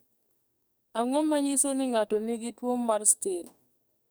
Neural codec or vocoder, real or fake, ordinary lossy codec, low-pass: codec, 44.1 kHz, 2.6 kbps, SNAC; fake; none; none